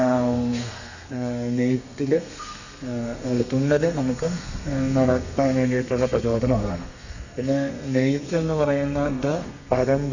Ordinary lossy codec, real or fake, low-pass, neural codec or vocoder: none; fake; 7.2 kHz; codec, 32 kHz, 1.9 kbps, SNAC